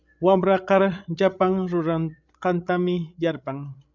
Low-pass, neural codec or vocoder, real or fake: 7.2 kHz; codec, 16 kHz, 16 kbps, FreqCodec, larger model; fake